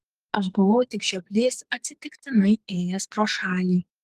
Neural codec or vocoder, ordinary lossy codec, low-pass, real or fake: codec, 44.1 kHz, 2.6 kbps, SNAC; Opus, 24 kbps; 14.4 kHz; fake